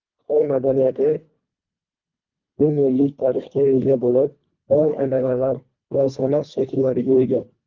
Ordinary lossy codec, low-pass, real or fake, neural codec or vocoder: Opus, 24 kbps; 7.2 kHz; fake; codec, 24 kHz, 1.5 kbps, HILCodec